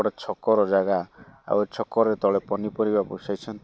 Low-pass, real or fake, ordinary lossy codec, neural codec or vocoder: none; real; none; none